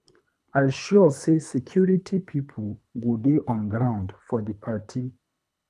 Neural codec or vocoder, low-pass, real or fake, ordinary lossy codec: codec, 24 kHz, 3 kbps, HILCodec; none; fake; none